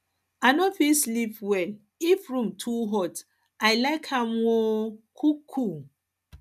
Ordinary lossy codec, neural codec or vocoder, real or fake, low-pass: none; none; real; 14.4 kHz